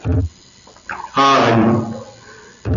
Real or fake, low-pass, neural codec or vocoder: real; 7.2 kHz; none